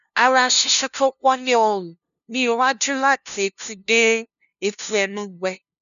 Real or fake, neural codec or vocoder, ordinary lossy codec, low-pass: fake; codec, 16 kHz, 0.5 kbps, FunCodec, trained on LibriTTS, 25 frames a second; none; 7.2 kHz